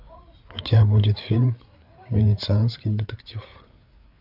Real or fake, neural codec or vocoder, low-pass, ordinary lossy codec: fake; codec, 16 kHz, 8 kbps, FreqCodec, larger model; 5.4 kHz; AAC, 48 kbps